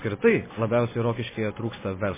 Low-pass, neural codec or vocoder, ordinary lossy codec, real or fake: 3.6 kHz; none; MP3, 16 kbps; real